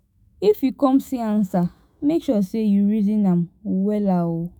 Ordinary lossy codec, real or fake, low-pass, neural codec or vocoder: none; fake; none; autoencoder, 48 kHz, 128 numbers a frame, DAC-VAE, trained on Japanese speech